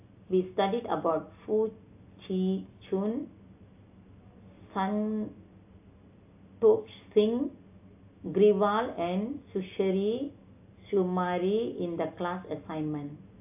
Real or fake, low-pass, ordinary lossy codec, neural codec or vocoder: real; 3.6 kHz; AAC, 32 kbps; none